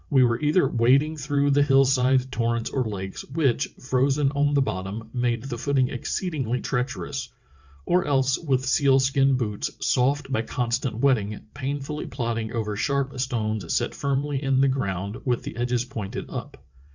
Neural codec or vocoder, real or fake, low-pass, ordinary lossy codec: vocoder, 22.05 kHz, 80 mel bands, WaveNeXt; fake; 7.2 kHz; Opus, 64 kbps